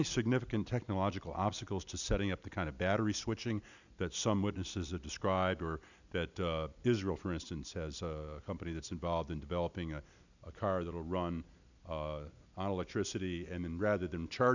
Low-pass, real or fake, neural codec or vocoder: 7.2 kHz; real; none